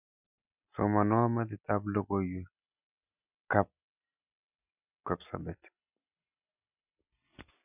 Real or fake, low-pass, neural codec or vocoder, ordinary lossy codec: real; 3.6 kHz; none; none